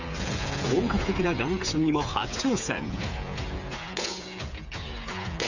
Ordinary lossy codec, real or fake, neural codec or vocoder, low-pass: none; fake; codec, 16 kHz, 8 kbps, FreqCodec, smaller model; 7.2 kHz